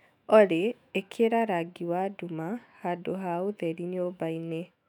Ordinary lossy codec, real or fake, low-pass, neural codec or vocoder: none; fake; 19.8 kHz; autoencoder, 48 kHz, 128 numbers a frame, DAC-VAE, trained on Japanese speech